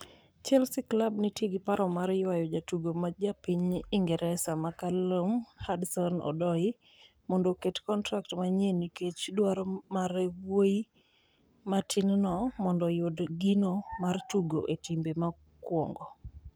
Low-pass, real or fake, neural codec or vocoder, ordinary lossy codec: none; fake; codec, 44.1 kHz, 7.8 kbps, Pupu-Codec; none